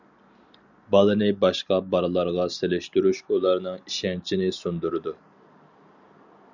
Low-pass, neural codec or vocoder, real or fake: 7.2 kHz; none; real